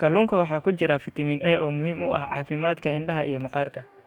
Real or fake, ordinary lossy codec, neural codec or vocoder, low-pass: fake; none; codec, 44.1 kHz, 2.6 kbps, DAC; 19.8 kHz